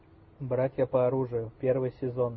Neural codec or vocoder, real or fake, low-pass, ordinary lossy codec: none; real; 7.2 kHz; MP3, 24 kbps